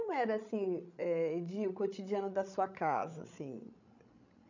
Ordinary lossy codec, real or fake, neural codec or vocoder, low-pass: none; fake; codec, 16 kHz, 16 kbps, FreqCodec, larger model; 7.2 kHz